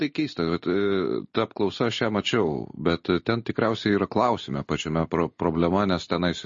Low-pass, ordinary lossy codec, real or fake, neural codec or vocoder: 7.2 kHz; MP3, 32 kbps; real; none